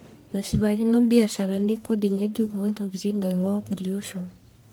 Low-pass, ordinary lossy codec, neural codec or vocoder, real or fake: none; none; codec, 44.1 kHz, 1.7 kbps, Pupu-Codec; fake